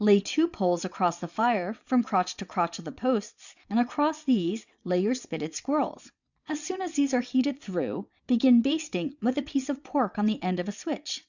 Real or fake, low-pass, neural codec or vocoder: fake; 7.2 kHz; vocoder, 22.05 kHz, 80 mel bands, WaveNeXt